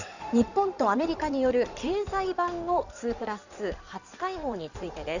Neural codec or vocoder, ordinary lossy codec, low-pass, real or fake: codec, 16 kHz in and 24 kHz out, 2.2 kbps, FireRedTTS-2 codec; none; 7.2 kHz; fake